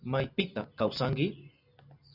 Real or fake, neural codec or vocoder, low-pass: real; none; 5.4 kHz